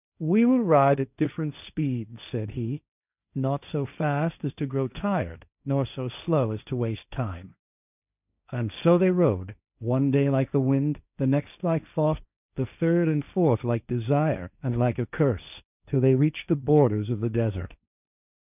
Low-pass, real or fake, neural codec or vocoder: 3.6 kHz; fake; codec, 16 kHz, 1.1 kbps, Voila-Tokenizer